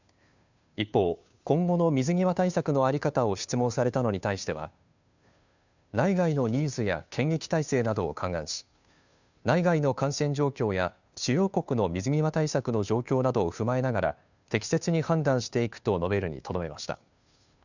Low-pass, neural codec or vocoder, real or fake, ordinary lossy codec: 7.2 kHz; codec, 16 kHz, 2 kbps, FunCodec, trained on Chinese and English, 25 frames a second; fake; none